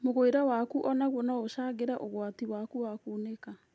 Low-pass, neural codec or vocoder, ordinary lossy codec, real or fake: none; none; none; real